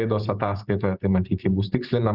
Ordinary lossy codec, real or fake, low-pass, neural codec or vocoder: Opus, 32 kbps; fake; 5.4 kHz; vocoder, 44.1 kHz, 128 mel bands every 512 samples, BigVGAN v2